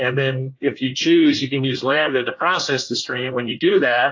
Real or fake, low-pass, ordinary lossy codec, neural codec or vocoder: fake; 7.2 kHz; AAC, 48 kbps; codec, 24 kHz, 1 kbps, SNAC